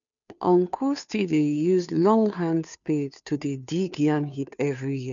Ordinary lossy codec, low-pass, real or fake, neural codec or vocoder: none; 7.2 kHz; fake; codec, 16 kHz, 2 kbps, FunCodec, trained on Chinese and English, 25 frames a second